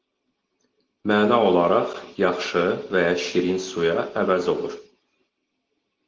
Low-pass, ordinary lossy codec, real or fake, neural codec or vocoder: 7.2 kHz; Opus, 16 kbps; real; none